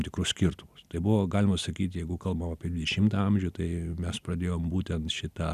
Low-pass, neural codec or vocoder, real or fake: 14.4 kHz; none; real